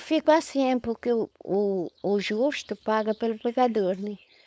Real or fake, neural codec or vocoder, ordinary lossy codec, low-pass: fake; codec, 16 kHz, 4.8 kbps, FACodec; none; none